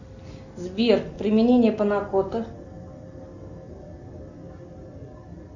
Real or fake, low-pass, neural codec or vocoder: real; 7.2 kHz; none